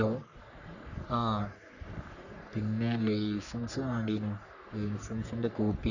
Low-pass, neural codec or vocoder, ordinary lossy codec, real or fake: 7.2 kHz; codec, 44.1 kHz, 3.4 kbps, Pupu-Codec; none; fake